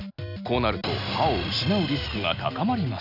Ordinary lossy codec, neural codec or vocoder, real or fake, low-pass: none; none; real; 5.4 kHz